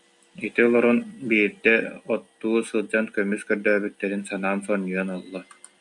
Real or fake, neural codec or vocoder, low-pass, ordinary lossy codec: real; none; 10.8 kHz; MP3, 96 kbps